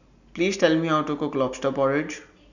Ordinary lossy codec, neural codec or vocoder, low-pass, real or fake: none; none; 7.2 kHz; real